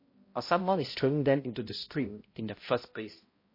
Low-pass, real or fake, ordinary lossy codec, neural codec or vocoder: 5.4 kHz; fake; MP3, 24 kbps; codec, 16 kHz, 0.5 kbps, X-Codec, HuBERT features, trained on balanced general audio